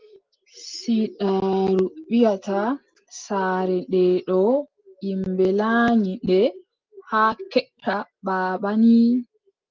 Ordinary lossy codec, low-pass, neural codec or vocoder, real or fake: Opus, 24 kbps; 7.2 kHz; none; real